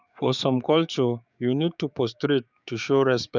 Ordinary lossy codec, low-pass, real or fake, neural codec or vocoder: none; 7.2 kHz; fake; codec, 16 kHz, 6 kbps, DAC